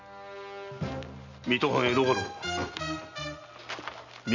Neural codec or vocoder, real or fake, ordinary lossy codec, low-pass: none; real; none; 7.2 kHz